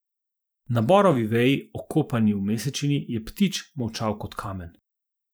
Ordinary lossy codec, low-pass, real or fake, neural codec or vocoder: none; none; fake; vocoder, 44.1 kHz, 128 mel bands every 512 samples, BigVGAN v2